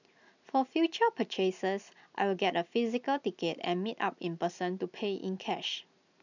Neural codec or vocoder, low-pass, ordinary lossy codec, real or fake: none; 7.2 kHz; none; real